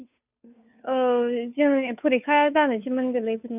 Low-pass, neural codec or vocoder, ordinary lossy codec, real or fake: 3.6 kHz; codec, 16 kHz, 2 kbps, FunCodec, trained on Chinese and English, 25 frames a second; none; fake